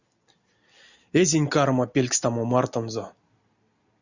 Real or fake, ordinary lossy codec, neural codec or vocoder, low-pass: real; Opus, 64 kbps; none; 7.2 kHz